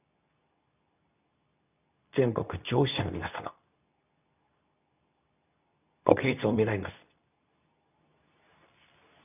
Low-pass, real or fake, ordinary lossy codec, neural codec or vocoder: 3.6 kHz; fake; none; codec, 24 kHz, 0.9 kbps, WavTokenizer, medium speech release version 2